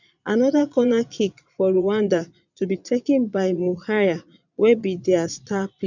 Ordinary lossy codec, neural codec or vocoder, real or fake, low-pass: none; vocoder, 22.05 kHz, 80 mel bands, Vocos; fake; 7.2 kHz